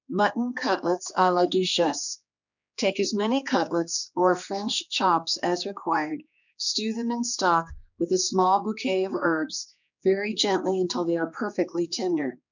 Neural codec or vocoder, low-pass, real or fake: codec, 16 kHz, 2 kbps, X-Codec, HuBERT features, trained on general audio; 7.2 kHz; fake